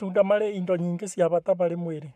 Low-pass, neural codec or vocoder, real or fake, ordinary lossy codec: 14.4 kHz; codec, 44.1 kHz, 7.8 kbps, Pupu-Codec; fake; MP3, 96 kbps